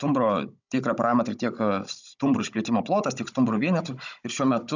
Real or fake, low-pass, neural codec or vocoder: fake; 7.2 kHz; codec, 16 kHz, 16 kbps, FunCodec, trained on Chinese and English, 50 frames a second